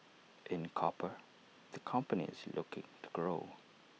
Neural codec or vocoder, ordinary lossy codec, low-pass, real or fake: none; none; none; real